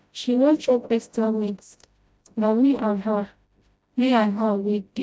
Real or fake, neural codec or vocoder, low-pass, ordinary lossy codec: fake; codec, 16 kHz, 0.5 kbps, FreqCodec, smaller model; none; none